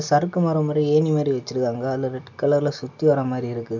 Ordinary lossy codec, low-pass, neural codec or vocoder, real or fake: none; 7.2 kHz; none; real